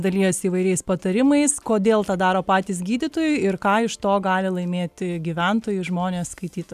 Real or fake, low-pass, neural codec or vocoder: real; 14.4 kHz; none